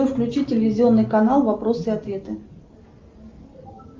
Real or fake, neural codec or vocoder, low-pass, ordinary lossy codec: real; none; 7.2 kHz; Opus, 24 kbps